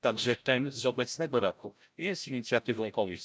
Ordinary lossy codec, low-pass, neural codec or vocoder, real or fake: none; none; codec, 16 kHz, 0.5 kbps, FreqCodec, larger model; fake